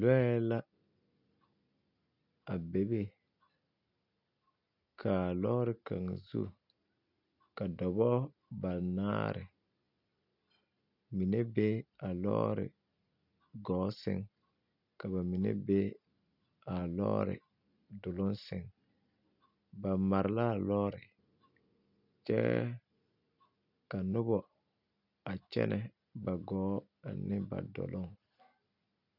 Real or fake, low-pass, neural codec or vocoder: real; 5.4 kHz; none